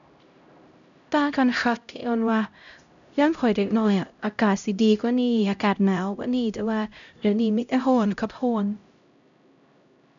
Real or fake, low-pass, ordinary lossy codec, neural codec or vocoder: fake; 7.2 kHz; none; codec, 16 kHz, 0.5 kbps, X-Codec, HuBERT features, trained on LibriSpeech